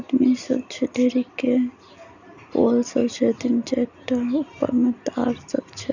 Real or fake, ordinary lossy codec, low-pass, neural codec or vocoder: real; none; 7.2 kHz; none